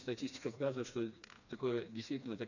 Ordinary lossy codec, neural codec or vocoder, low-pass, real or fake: none; codec, 16 kHz, 2 kbps, FreqCodec, smaller model; 7.2 kHz; fake